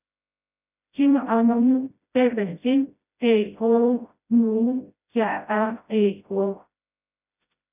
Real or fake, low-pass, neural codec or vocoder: fake; 3.6 kHz; codec, 16 kHz, 0.5 kbps, FreqCodec, smaller model